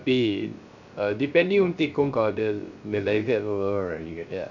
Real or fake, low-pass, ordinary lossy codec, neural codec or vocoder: fake; 7.2 kHz; none; codec, 16 kHz, 0.3 kbps, FocalCodec